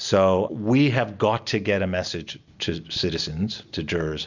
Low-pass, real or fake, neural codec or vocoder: 7.2 kHz; real; none